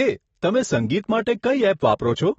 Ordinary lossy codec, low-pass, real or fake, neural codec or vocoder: AAC, 24 kbps; 19.8 kHz; real; none